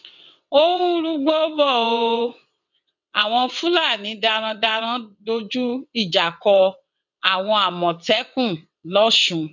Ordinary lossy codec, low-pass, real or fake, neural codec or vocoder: none; 7.2 kHz; fake; vocoder, 22.05 kHz, 80 mel bands, WaveNeXt